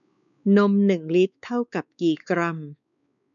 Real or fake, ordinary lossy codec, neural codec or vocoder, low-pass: fake; none; codec, 16 kHz, 4 kbps, X-Codec, WavLM features, trained on Multilingual LibriSpeech; 7.2 kHz